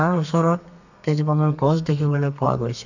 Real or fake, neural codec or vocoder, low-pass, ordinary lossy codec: fake; codec, 32 kHz, 1.9 kbps, SNAC; 7.2 kHz; none